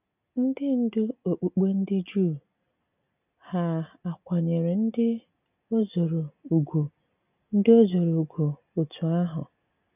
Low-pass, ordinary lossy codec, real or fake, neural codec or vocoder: 3.6 kHz; none; real; none